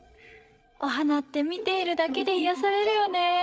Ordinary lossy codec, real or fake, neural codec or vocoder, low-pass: none; fake; codec, 16 kHz, 8 kbps, FreqCodec, larger model; none